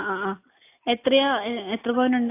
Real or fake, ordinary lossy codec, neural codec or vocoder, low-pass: real; AAC, 24 kbps; none; 3.6 kHz